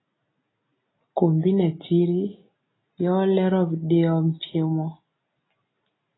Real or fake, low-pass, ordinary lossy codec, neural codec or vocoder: real; 7.2 kHz; AAC, 16 kbps; none